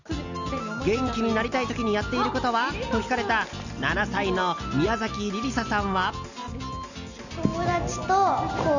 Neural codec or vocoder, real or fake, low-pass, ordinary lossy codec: none; real; 7.2 kHz; none